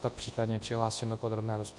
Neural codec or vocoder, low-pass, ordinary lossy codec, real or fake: codec, 24 kHz, 0.9 kbps, WavTokenizer, large speech release; 10.8 kHz; MP3, 48 kbps; fake